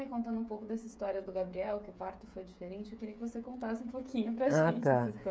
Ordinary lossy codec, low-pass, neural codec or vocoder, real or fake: none; none; codec, 16 kHz, 16 kbps, FreqCodec, smaller model; fake